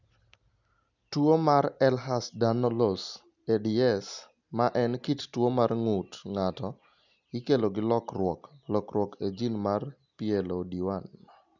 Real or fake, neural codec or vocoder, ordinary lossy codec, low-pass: real; none; none; 7.2 kHz